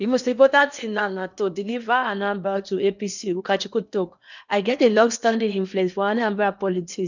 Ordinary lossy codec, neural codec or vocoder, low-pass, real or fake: none; codec, 16 kHz in and 24 kHz out, 0.8 kbps, FocalCodec, streaming, 65536 codes; 7.2 kHz; fake